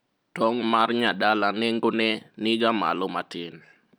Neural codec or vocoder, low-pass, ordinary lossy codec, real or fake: none; none; none; real